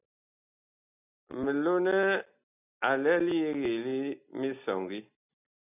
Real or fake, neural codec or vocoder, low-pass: real; none; 3.6 kHz